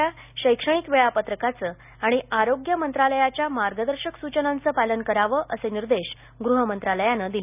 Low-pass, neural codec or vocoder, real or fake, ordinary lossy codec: 3.6 kHz; none; real; none